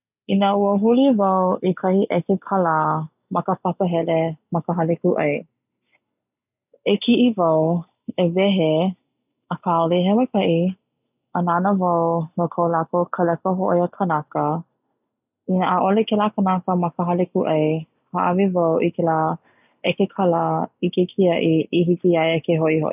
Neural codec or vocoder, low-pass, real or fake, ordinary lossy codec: none; 3.6 kHz; real; none